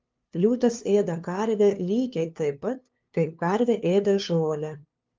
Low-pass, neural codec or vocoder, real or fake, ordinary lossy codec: 7.2 kHz; codec, 16 kHz, 2 kbps, FunCodec, trained on LibriTTS, 25 frames a second; fake; Opus, 24 kbps